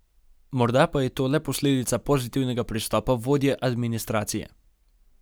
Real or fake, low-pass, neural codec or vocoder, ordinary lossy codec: real; none; none; none